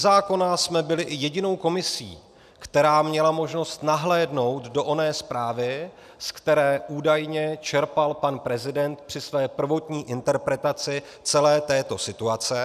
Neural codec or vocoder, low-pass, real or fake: none; 14.4 kHz; real